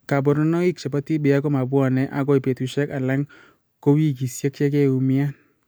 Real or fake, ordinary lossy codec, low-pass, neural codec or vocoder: real; none; none; none